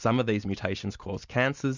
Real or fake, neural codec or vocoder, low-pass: real; none; 7.2 kHz